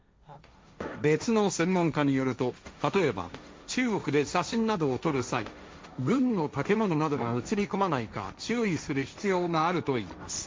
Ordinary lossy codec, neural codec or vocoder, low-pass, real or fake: none; codec, 16 kHz, 1.1 kbps, Voila-Tokenizer; none; fake